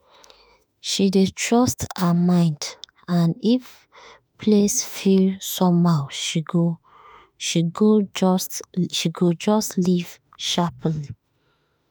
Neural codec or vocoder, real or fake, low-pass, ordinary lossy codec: autoencoder, 48 kHz, 32 numbers a frame, DAC-VAE, trained on Japanese speech; fake; none; none